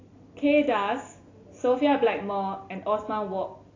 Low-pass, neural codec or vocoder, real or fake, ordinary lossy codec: 7.2 kHz; none; real; AAC, 32 kbps